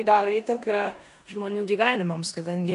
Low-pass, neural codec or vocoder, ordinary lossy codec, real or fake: 10.8 kHz; codec, 16 kHz in and 24 kHz out, 0.9 kbps, LongCat-Audio-Codec, four codebook decoder; MP3, 96 kbps; fake